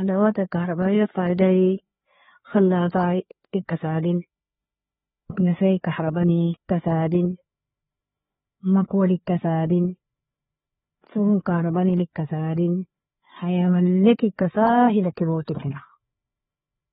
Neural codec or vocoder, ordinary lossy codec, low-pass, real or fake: codec, 16 kHz, 4 kbps, FreqCodec, larger model; AAC, 16 kbps; 7.2 kHz; fake